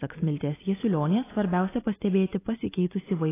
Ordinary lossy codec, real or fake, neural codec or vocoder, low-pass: AAC, 16 kbps; real; none; 3.6 kHz